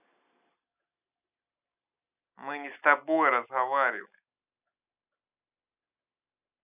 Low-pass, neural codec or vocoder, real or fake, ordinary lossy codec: 3.6 kHz; none; real; none